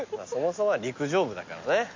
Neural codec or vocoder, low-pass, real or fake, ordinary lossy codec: none; 7.2 kHz; real; MP3, 48 kbps